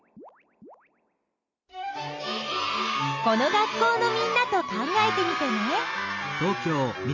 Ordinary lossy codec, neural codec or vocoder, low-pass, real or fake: none; none; 7.2 kHz; real